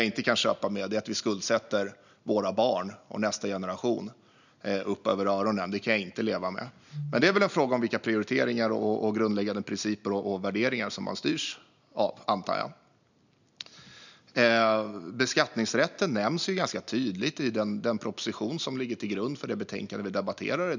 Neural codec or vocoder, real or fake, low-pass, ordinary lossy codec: none; real; 7.2 kHz; none